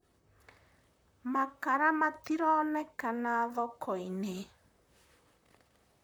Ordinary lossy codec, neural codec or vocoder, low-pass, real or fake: none; vocoder, 44.1 kHz, 128 mel bands, Pupu-Vocoder; none; fake